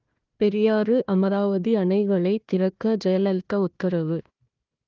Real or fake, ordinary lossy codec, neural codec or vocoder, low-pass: fake; Opus, 24 kbps; codec, 16 kHz, 1 kbps, FunCodec, trained on Chinese and English, 50 frames a second; 7.2 kHz